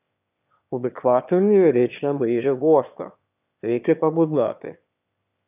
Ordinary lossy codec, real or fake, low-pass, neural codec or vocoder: none; fake; 3.6 kHz; autoencoder, 22.05 kHz, a latent of 192 numbers a frame, VITS, trained on one speaker